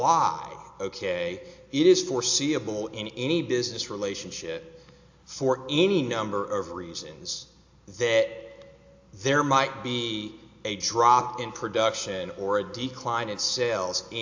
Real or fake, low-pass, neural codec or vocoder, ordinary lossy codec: real; 7.2 kHz; none; AAC, 48 kbps